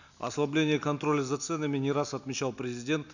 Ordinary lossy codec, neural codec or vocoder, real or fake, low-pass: none; none; real; 7.2 kHz